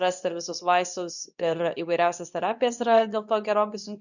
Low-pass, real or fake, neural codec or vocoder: 7.2 kHz; fake; codec, 24 kHz, 0.9 kbps, WavTokenizer, medium speech release version 2